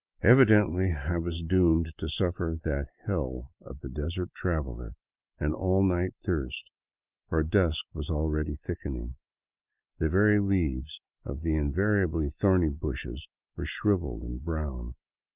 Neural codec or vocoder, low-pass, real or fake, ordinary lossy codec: none; 3.6 kHz; real; Opus, 24 kbps